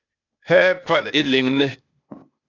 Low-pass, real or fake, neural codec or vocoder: 7.2 kHz; fake; codec, 16 kHz, 0.8 kbps, ZipCodec